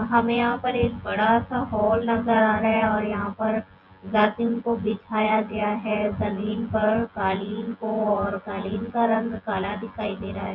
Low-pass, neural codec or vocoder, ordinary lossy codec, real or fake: 5.4 kHz; vocoder, 24 kHz, 100 mel bands, Vocos; Opus, 64 kbps; fake